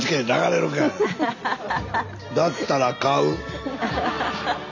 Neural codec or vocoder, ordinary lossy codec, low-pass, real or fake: none; none; 7.2 kHz; real